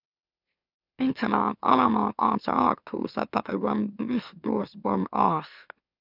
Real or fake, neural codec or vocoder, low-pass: fake; autoencoder, 44.1 kHz, a latent of 192 numbers a frame, MeloTTS; 5.4 kHz